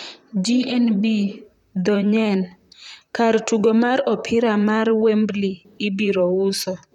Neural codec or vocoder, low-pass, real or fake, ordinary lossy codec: vocoder, 44.1 kHz, 128 mel bands, Pupu-Vocoder; 19.8 kHz; fake; none